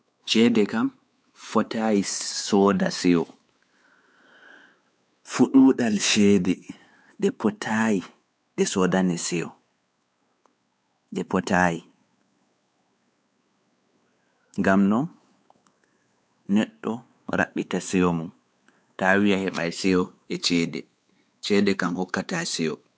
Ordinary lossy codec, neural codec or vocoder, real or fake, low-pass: none; codec, 16 kHz, 4 kbps, X-Codec, WavLM features, trained on Multilingual LibriSpeech; fake; none